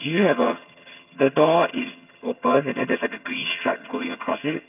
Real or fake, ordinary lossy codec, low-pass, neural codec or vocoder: fake; none; 3.6 kHz; vocoder, 22.05 kHz, 80 mel bands, HiFi-GAN